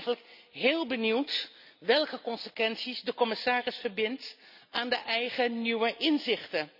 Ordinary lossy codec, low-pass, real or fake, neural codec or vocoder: none; 5.4 kHz; real; none